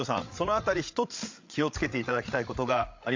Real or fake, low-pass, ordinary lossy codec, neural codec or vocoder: fake; 7.2 kHz; MP3, 48 kbps; codec, 16 kHz, 8 kbps, FreqCodec, larger model